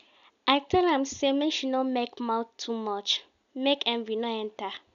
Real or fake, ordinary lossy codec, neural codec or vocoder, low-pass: real; none; none; 7.2 kHz